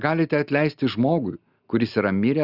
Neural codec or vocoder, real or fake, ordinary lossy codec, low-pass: none; real; Opus, 64 kbps; 5.4 kHz